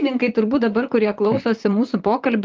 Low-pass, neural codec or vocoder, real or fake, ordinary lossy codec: 7.2 kHz; vocoder, 44.1 kHz, 128 mel bands, Pupu-Vocoder; fake; Opus, 32 kbps